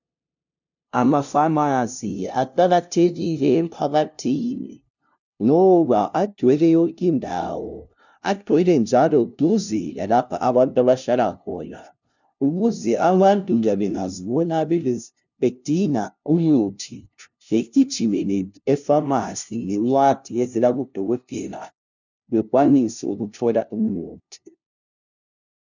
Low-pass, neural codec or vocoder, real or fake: 7.2 kHz; codec, 16 kHz, 0.5 kbps, FunCodec, trained on LibriTTS, 25 frames a second; fake